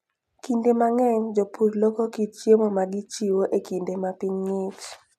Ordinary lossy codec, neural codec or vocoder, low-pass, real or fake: none; none; 14.4 kHz; real